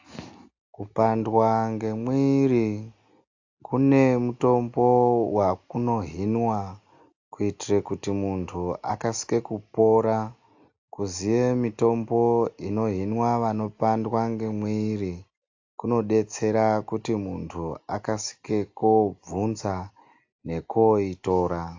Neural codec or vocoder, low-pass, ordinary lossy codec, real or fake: none; 7.2 kHz; AAC, 48 kbps; real